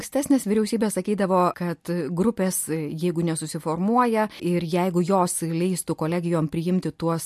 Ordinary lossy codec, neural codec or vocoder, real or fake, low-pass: MP3, 64 kbps; none; real; 14.4 kHz